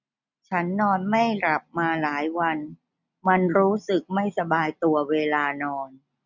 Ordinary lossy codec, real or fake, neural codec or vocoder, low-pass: none; real; none; 7.2 kHz